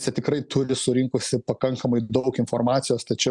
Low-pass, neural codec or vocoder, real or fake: 10.8 kHz; none; real